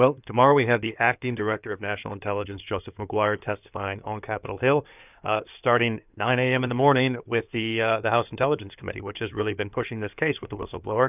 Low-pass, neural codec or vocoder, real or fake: 3.6 kHz; codec, 16 kHz in and 24 kHz out, 2.2 kbps, FireRedTTS-2 codec; fake